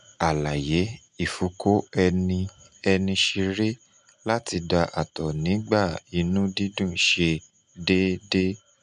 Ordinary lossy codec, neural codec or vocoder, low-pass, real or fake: none; none; 10.8 kHz; real